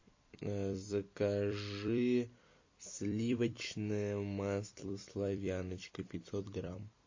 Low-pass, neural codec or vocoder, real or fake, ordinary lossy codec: 7.2 kHz; vocoder, 44.1 kHz, 128 mel bands every 256 samples, BigVGAN v2; fake; MP3, 32 kbps